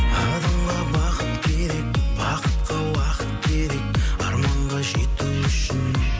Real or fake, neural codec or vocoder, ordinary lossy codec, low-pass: real; none; none; none